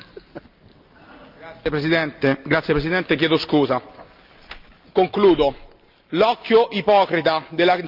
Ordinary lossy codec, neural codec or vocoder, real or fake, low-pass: Opus, 24 kbps; none; real; 5.4 kHz